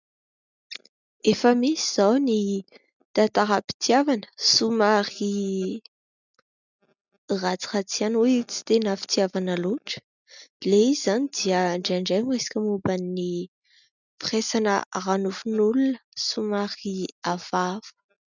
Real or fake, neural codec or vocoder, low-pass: real; none; 7.2 kHz